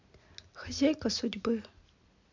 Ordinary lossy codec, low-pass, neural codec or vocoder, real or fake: MP3, 64 kbps; 7.2 kHz; none; real